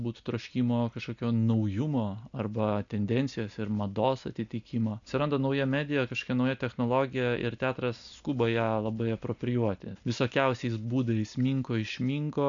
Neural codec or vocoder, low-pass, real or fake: none; 7.2 kHz; real